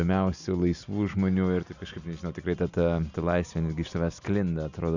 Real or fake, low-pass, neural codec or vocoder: real; 7.2 kHz; none